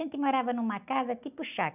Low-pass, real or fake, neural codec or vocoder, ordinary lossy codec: 3.6 kHz; real; none; none